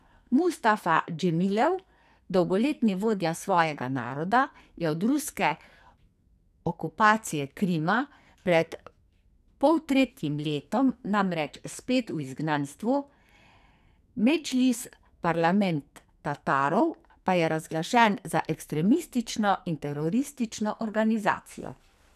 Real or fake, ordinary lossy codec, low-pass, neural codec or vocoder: fake; none; 14.4 kHz; codec, 44.1 kHz, 2.6 kbps, SNAC